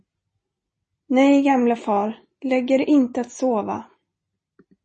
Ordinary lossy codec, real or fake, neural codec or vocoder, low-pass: MP3, 32 kbps; real; none; 10.8 kHz